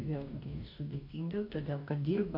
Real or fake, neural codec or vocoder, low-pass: fake; codec, 44.1 kHz, 2.6 kbps, DAC; 5.4 kHz